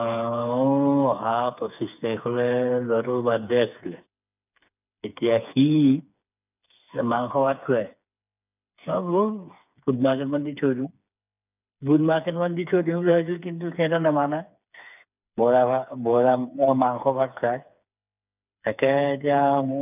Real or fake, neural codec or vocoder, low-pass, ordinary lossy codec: fake; codec, 16 kHz, 4 kbps, FreqCodec, smaller model; 3.6 kHz; none